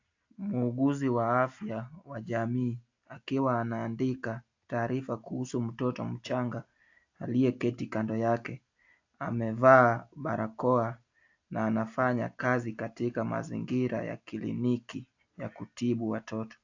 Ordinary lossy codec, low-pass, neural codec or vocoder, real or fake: AAC, 48 kbps; 7.2 kHz; none; real